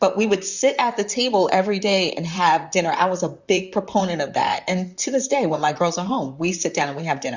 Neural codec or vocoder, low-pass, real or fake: vocoder, 44.1 kHz, 128 mel bands, Pupu-Vocoder; 7.2 kHz; fake